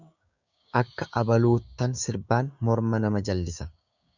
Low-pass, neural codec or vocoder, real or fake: 7.2 kHz; codec, 16 kHz, 6 kbps, DAC; fake